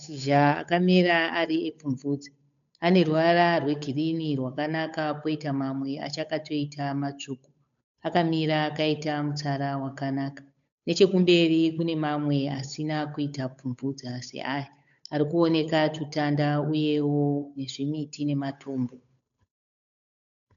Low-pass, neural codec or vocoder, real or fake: 7.2 kHz; codec, 16 kHz, 8 kbps, FunCodec, trained on Chinese and English, 25 frames a second; fake